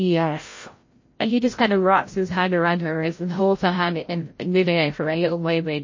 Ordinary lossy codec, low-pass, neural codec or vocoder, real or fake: MP3, 32 kbps; 7.2 kHz; codec, 16 kHz, 0.5 kbps, FreqCodec, larger model; fake